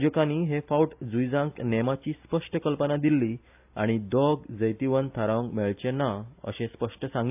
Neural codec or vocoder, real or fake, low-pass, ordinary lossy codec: none; real; 3.6 kHz; AAC, 32 kbps